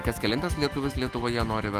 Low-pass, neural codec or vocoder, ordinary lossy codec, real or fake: 14.4 kHz; autoencoder, 48 kHz, 128 numbers a frame, DAC-VAE, trained on Japanese speech; Opus, 32 kbps; fake